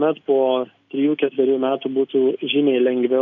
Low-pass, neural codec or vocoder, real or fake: 7.2 kHz; none; real